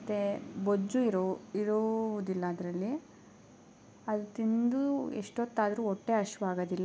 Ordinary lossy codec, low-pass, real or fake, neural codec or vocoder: none; none; real; none